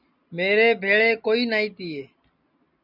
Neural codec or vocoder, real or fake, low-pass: none; real; 5.4 kHz